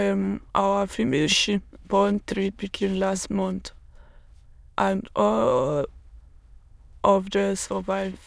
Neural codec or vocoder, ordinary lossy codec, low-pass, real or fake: autoencoder, 22.05 kHz, a latent of 192 numbers a frame, VITS, trained on many speakers; none; none; fake